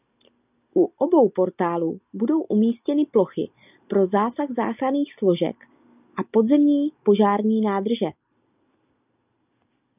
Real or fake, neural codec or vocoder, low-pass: real; none; 3.6 kHz